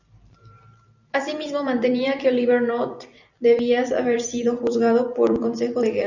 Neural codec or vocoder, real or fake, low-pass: vocoder, 44.1 kHz, 128 mel bands every 256 samples, BigVGAN v2; fake; 7.2 kHz